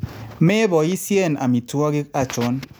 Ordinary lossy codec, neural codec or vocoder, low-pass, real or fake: none; none; none; real